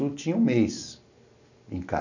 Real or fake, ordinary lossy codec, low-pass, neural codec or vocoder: real; none; 7.2 kHz; none